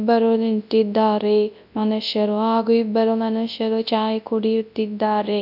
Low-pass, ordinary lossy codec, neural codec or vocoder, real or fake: 5.4 kHz; none; codec, 24 kHz, 0.9 kbps, WavTokenizer, large speech release; fake